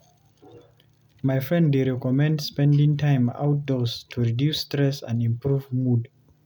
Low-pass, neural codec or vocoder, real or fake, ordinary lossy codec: 19.8 kHz; none; real; none